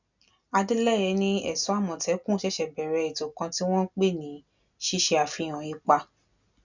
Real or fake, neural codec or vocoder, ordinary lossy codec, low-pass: real; none; none; 7.2 kHz